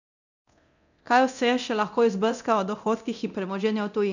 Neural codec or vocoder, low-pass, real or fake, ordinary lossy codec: codec, 24 kHz, 0.9 kbps, DualCodec; 7.2 kHz; fake; none